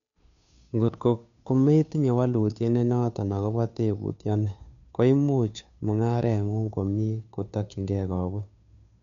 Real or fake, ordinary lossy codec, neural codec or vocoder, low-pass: fake; none; codec, 16 kHz, 2 kbps, FunCodec, trained on Chinese and English, 25 frames a second; 7.2 kHz